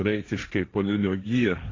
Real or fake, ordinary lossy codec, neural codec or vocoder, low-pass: fake; AAC, 32 kbps; codec, 16 kHz, 1.1 kbps, Voila-Tokenizer; 7.2 kHz